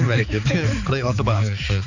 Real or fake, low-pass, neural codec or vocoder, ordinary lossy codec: fake; 7.2 kHz; codec, 16 kHz, 4 kbps, X-Codec, HuBERT features, trained on balanced general audio; none